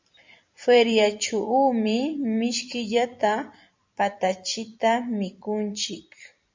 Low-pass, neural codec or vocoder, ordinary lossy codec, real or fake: 7.2 kHz; vocoder, 44.1 kHz, 128 mel bands every 256 samples, BigVGAN v2; MP3, 64 kbps; fake